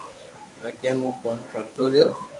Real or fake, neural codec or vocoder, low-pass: fake; codec, 24 kHz, 0.9 kbps, WavTokenizer, medium speech release version 1; 10.8 kHz